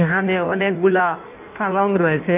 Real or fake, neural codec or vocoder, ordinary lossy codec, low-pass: fake; codec, 16 kHz in and 24 kHz out, 1.1 kbps, FireRedTTS-2 codec; none; 3.6 kHz